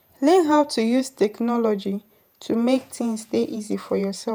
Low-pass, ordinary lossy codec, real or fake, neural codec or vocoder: none; none; fake; vocoder, 48 kHz, 128 mel bands, Vocos